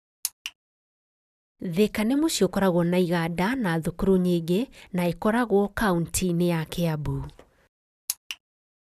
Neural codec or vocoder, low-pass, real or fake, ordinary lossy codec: none; 14.4 kHz; real; none